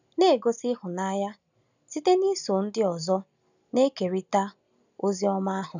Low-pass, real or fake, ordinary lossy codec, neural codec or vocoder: 7.2 kHz; real; none; none